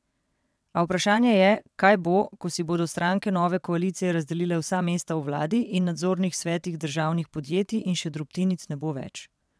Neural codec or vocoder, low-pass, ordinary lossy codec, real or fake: vocoder, 22.05 kHz, 80 mel bands, WaveNeXt; none; none; fake